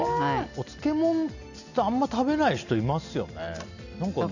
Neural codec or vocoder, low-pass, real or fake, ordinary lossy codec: none; 7.2 kHz; real; none